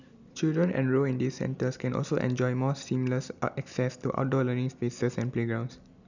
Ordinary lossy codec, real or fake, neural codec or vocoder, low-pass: none; real; none; 7.2 kHz